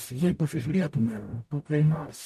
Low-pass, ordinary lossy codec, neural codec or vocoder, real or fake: 14.4 kHz; MP3, 64 kbps; codec, 44.1 kHz, 0.9 kbps, DAC; fake